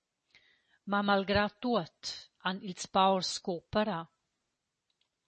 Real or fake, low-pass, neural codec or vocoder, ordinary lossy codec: real; 10.8 kHz; none; MP3, 32 kbps